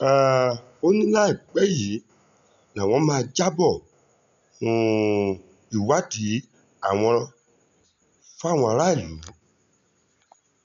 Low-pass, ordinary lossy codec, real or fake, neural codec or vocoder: 7.2 kHz; none; real; none